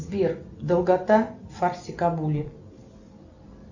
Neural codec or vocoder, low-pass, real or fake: none; 7.2 kHz; real